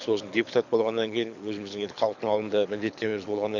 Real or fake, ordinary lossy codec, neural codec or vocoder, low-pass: fake; none; codec, 24 kHz, 6 kbps, HILCodec; 7.2 kHz